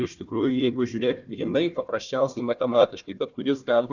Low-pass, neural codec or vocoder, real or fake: 7.2 kHz; codec, 16 kHz, 1 kbps, FunCodec, trained on Chinese and English, 50 frames a second; fake